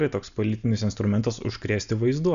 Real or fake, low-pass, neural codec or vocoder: real; 7.2 kHz; none